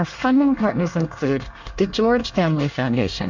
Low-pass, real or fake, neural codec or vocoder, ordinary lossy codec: 7.2 kHz; fake; codec, 24 kHz, 1 kbps, SNAC; MP3, 64 kbps